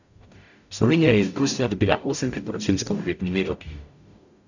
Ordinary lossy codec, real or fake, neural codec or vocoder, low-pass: none; fake; codec, 44.1 kHz, 0.9 kbps, DAC; 7.2 kHz